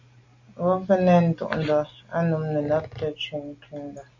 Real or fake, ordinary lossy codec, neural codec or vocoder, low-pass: real; MP3, 48 kbps; none; 7.2 kHz